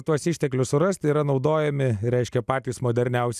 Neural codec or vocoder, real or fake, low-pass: none; real; 14.4 kHz